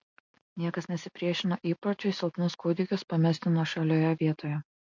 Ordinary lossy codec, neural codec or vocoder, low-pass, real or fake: MP3, 48 kbps; none; 7.2 kHz; real